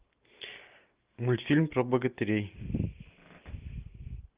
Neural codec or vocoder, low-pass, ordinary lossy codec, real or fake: none; 3.6 kHz; Opus, 24 kbps; real